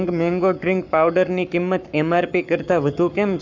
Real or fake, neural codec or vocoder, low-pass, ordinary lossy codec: fake; codec, 44.1 kHz, 7.8 kbps, Pupu-Codec; 7.2 kHz; none